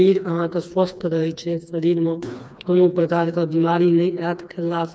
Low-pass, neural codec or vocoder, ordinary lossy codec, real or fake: none; codec, 16 kHz, 2 kbps, FreqCodec, smaller model; none; fake